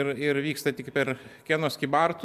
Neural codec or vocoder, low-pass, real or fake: none; 14.4 kHz; real